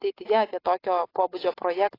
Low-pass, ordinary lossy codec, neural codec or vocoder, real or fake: 5.4 kHz; AAC, 24 kbps; none; real